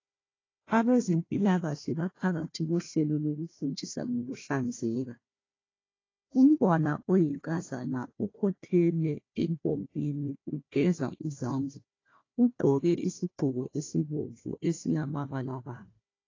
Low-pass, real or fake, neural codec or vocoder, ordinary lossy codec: 7.2 kHz; fake; codec, 16 kHz, 1 kbps, FunCodec, trained on Chinese and English, 50 frames a second; AAC, 32 kbps